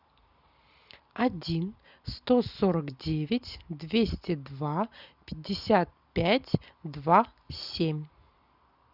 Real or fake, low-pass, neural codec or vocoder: real; 5.4 kHz; none